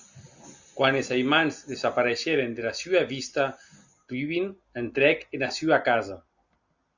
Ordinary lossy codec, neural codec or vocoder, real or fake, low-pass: Opus, 64 kbps; none; real; 7.2 kHz